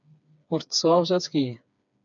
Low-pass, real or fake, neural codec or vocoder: 7.2 kHz; fake; codec, 16 kHz, 4 kbps, FreqCodec, smaller model